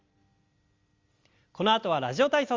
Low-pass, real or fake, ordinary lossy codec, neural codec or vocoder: 7.2 kHz; real; Opus, 32 kbps; none